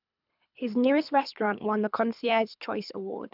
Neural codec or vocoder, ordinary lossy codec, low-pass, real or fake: codec, 24 kHz, 3 kbps, HILCodec; none; 5.4 kHz; fake